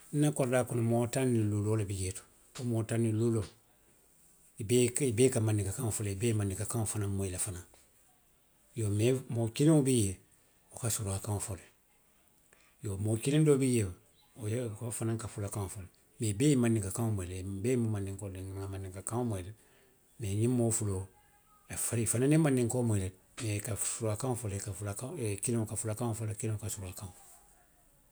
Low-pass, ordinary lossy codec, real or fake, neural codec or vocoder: none; none; real; none